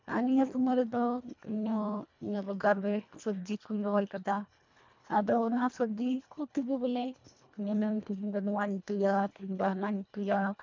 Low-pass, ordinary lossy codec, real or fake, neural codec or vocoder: 7.2 kHz; MP3, 64 kbps; fake; codec, 24 kHz, 1.5 kbps, HILCodec